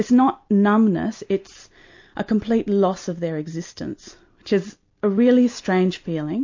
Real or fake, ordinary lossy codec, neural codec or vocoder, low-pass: real; MP3, 48 kbps; none; 7.2 kHz